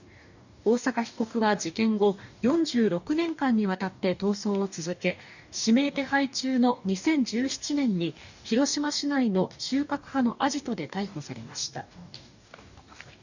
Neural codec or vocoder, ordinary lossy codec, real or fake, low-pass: codec, 44.1 kHz, 2.6 kbps, DAC; none; fake; 7.2 kHz